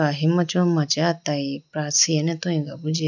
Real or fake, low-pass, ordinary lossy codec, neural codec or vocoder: real; 7.2 kHz; none; none